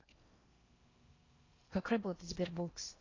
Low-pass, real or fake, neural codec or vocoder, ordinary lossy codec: 7.2 kHz; fake; codec, 16 kHz in and 24 kHz out, 0.6 kbps, FocalCodec, streaming, 4096 codes; AAC, 32 kbps